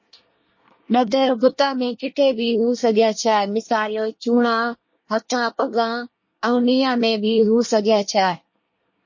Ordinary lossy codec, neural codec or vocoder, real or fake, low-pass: MP3, 32 kbps; codec, 24 kHz, 1 kbps, SNAC; fake; 7.2 kHz